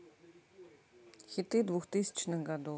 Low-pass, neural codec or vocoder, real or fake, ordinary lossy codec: none; none; real; none